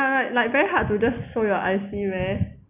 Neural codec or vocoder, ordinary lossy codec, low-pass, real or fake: none; none; 3.6 kHz; real